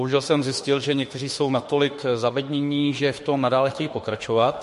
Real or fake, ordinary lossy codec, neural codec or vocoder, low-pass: fake; MP3, 48 kbps; autoencoder, 48 kHz, 32 numbers a frame, DAC-VAE, trained on Japanese speech; 14.4 kHz